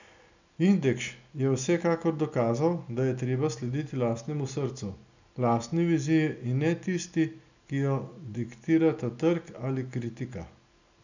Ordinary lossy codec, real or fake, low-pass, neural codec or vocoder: none; real; 7.2 kHz; none